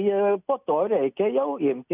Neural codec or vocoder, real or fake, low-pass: none; real; 3.6 kHz